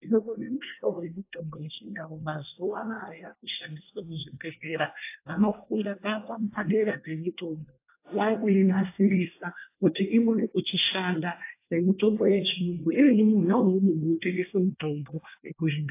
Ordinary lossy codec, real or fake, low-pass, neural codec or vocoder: AAC, 24 kbps; fake; 3.6 kHz; codec, 24 kHz, 1 kbps, SNAC